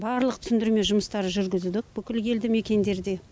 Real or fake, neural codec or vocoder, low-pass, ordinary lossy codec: real; none; none; none